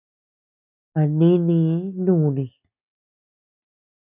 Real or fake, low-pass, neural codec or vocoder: real; 3.6 kHz; none